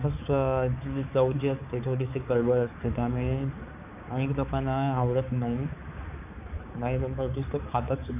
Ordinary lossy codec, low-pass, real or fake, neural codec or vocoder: none; 3.6 kHz; fake; codec, 16 kHz, 4 kbps, X-Codec, HuBERT features, trained on balanced general audio